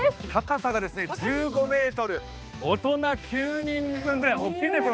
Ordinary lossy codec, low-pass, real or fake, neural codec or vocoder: none; none; fake; codec, 16 kHz, 2 kbps, X-Codec, HuBERT features, trained on balanced general audio